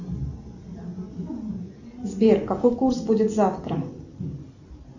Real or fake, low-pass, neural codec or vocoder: real; 7.2 kHz; none